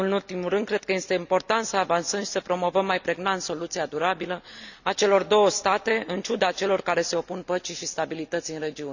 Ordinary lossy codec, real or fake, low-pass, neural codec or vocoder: none; real; 7.2 kHz; none